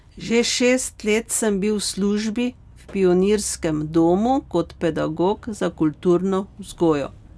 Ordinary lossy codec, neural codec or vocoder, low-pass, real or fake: none; none; none; real